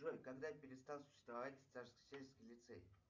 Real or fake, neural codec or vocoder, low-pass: real; none; 7.2 kHz